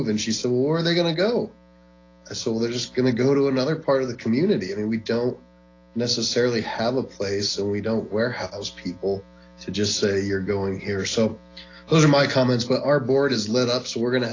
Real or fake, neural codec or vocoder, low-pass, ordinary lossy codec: real; none; 7.2 kHz; AAC, 32 kbps